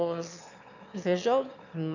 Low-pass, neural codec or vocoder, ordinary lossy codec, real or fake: 7.2 kHz; autoencoder, 22.05 kHz, a latent of 192 numbers a frame, VITS, trained on one speaker; none; fake